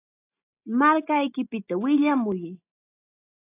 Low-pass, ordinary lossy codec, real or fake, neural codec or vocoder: 3.6 kHz; AAC, 24 kbps; real; none